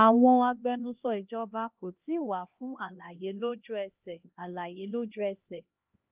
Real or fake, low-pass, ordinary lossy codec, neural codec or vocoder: fake; 3.6 kHz; Opus, 24 kbps; codec, 16 kHz, 1 kbps, X-Codec, HuBERT features, trained on LibriSpeech